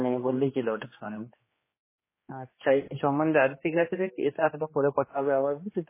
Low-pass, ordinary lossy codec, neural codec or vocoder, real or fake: 3.6 kHz; MP3, 16 kbps; codec, 16 kHz, 2 kbps, X-Codec, HuBERT features, trained on general audio; fake